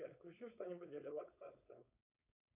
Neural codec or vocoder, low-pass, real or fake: codec, 16 kHz, 4.8 kbps, FACodec; 3.6 kHz; fake